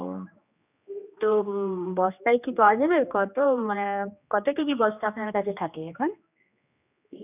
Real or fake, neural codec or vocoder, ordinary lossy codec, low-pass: fake; codec, 16 kHz, 2 kbps, X-Codec, HuBERT features, trained on general audio; none; 3.6 kHz